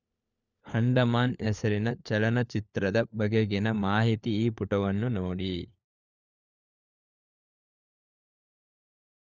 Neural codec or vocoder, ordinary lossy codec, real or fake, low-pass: codec, 16 kHz, 4 kbps, FunCodec, trained on LibriTTS, 50 frames a second; none; fake; 7.2 kHz